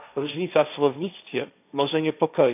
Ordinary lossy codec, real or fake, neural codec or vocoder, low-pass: none; fake; codec, 16 kHz, 1.1 kbps, Voila-Tokenizer; 3.6 kHz